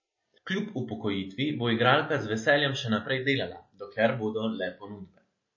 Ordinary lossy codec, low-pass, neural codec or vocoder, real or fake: MP3, 32 kbps; 7.2 kHz; none; real